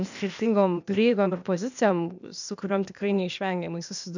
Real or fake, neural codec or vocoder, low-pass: fake; codec, 16 kHz, 0.8 kbps, ZipCodec; 7.2 kHz